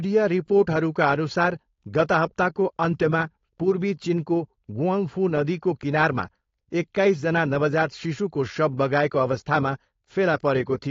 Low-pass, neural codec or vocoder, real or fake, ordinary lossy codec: 7.2 kHz; codec, 16 kHz, 4.8 kbps, FACodec; fake; AAC, 32 kbps